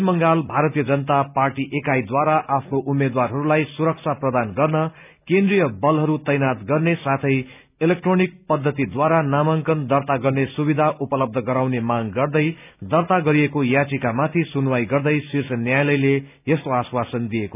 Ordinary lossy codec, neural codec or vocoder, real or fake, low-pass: none; none; real; 3.6 kHz